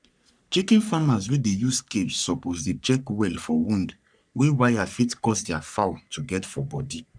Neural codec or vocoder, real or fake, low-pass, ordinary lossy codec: codec, 44.1 kHz, 3.4 kbps, Pupu-Codec; fake; 9.9 kHz; none